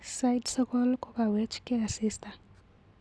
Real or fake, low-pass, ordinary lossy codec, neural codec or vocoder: real; none; none; none